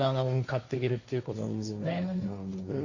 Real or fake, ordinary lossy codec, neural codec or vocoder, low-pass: fake; none; codec, 16 kHz, 1.1 kbps, Voila-Tokenizer; 7.2 kHz